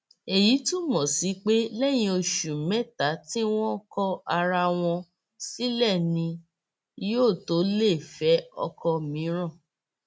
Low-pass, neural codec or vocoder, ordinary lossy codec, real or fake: none; none; none; real